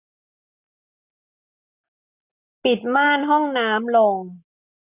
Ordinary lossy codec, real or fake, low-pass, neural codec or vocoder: none; real; 3.6 kHz; none